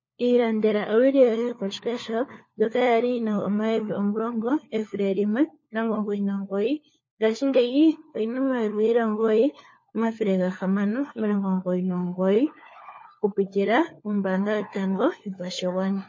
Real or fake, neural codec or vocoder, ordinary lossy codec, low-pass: fake; codec, 16 kHz, 4 kbps, FunCodec, trained on LibriTTS, 50 frames a second; MP3, 32 kbps; 7.2 kHz